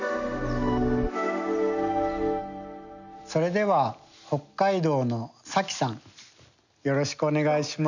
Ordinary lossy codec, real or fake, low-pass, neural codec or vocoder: none; real; 7.2 kHz; none